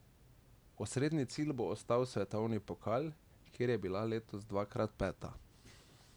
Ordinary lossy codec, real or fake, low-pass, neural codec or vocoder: none; real; none; none